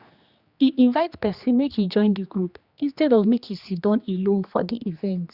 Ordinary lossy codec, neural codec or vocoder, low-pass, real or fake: Opus, 64 kbps; codec, 16 kHz, 2 kbps, X-Codec, HuBERT features, trained on general audio; 5.4 kHz; fake